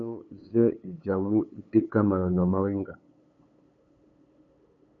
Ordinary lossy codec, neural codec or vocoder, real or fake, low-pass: MP3, 96 kbps; codec, 16 kHz, 8 kbps, FunCodec, trained on LibriTTS, 25 frames a second; fake; 7.2 kHz